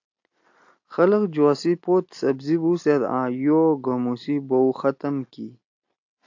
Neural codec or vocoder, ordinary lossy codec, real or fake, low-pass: none; AAC, 48 kbps; real; 7.2 kHz